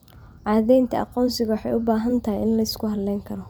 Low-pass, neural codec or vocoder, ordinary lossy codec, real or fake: none; vocoder, 44.1 kHz, 128 mel bands every 512 samples, BigVGAN v2; none; fake